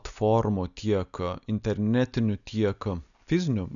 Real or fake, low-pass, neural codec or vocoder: real; 7.2 kHz; none